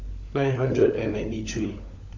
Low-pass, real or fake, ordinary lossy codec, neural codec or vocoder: 7.2 kHz; fake; AAC, 48 kbps; codec, 16 kHz, 16 kbps, FunCodec, trained on LibriTTS, 50 frames a second